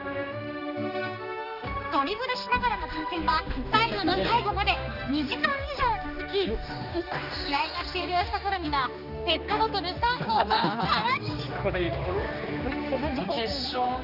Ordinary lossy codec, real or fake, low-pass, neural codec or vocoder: none; fake; 5.4 kHz; codec, 16 kHz, 2 kbps, X-Codec, HuBERT features, trained on general audio